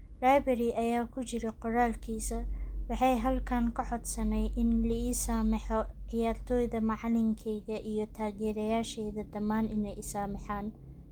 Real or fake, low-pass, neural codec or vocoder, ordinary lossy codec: real; 19.8 kHz; none; Opus, 32 kbps